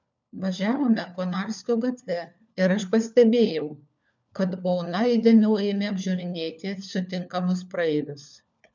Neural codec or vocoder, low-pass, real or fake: codec, 16 kHz, 4 kbps, FunCodec, trained on LibriTTS, 50 frames a second; 7.2 kHz; fake